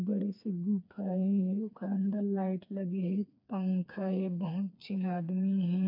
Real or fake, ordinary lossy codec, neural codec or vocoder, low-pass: fake; MP3, 48 kbps; codec, 16 kHz, 4 kbps, FreqCodec, smaller model; 5.4 kHz